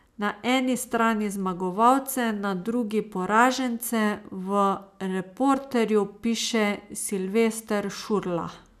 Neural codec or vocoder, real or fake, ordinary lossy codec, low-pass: none; real; none; 14.4 kHz